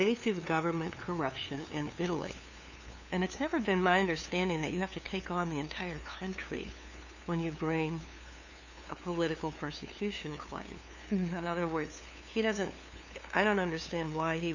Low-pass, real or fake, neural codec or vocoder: 7.2 kHz; fake; codec, 16 kHz, 2 kbps, FunCodec, trained on LibriTTS, 25 frames a second